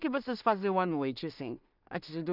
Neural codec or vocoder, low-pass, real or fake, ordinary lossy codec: codec, 16 kHz in and 24 kHz out, 0.4 kbps, LongCat-Audio-Codec, two codebook decoder; 5.4 kHz; fake; none